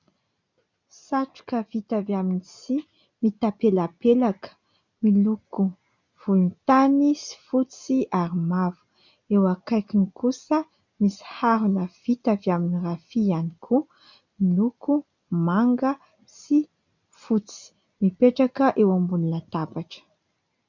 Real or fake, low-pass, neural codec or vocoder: real; 7.2 kHz; none